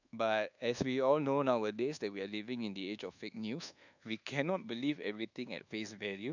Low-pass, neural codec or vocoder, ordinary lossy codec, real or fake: 7.2 kHz; codec, 24 kHz, 1.2 kbps, DualCodec; none; fake